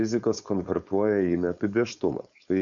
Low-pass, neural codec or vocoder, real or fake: 7.2 kHz; codec, 16 kHz, 4.8 kbps, FACodec; fake